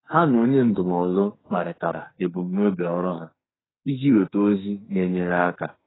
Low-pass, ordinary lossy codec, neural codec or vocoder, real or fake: 7.2 kHz; AAC, 16 kbps; codec, 44.1 kHz, 2.6 kbps, SNAC; fake